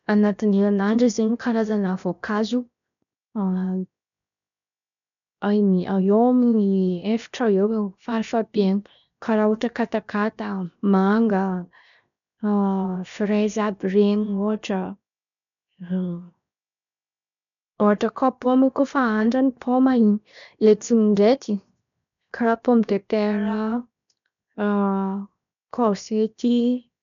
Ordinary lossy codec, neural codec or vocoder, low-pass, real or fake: MP3, 96 kbps; codec, 16 kHz, 0.7 kbps, FocalCodec; 7.2 kHz; fake